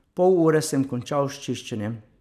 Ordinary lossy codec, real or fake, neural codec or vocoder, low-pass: none; real; none; 14.4 kHz